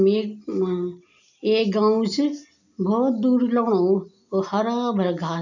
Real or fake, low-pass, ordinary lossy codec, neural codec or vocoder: real; 7.2 kHz; none; none